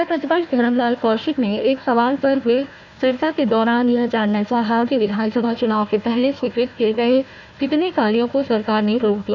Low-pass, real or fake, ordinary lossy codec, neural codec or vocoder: 7.2 kHz; fake; none; codec, 16 kHz, 1 kbps, FunCodec, trained on Chinese and English, 50 frames a second